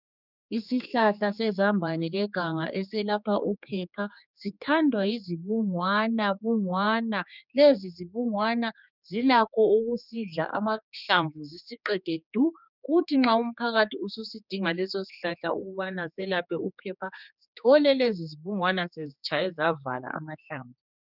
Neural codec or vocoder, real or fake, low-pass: codec, 16 kHz, 4 kbps, X-Codec, HuBERT features, trained on general audio; fake; 5.4 kHz